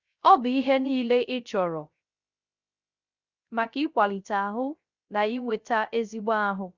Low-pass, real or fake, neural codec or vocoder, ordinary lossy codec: 7.2 kHz; fake; codec, 16 kHz, 0.3 kbps, FocalCodec; Opus, 64 kbps